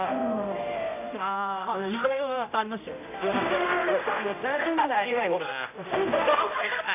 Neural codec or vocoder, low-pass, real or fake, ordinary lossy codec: codec, 16 kHz, 0.5 kbps, X-Codec, HuBERT features, trained on general audio; 3.6 kHz; fake; none